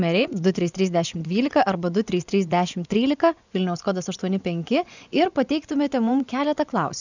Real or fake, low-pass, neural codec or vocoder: fake; 7.2 kHz; vocoder, 24 kHz, 100 mel bands, Vocos